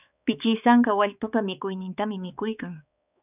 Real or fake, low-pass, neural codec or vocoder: fake; 3.6 kHz; codec, 16 kHz, 4 kbps, X-Codec, HuBERT features, trained on balanced general audio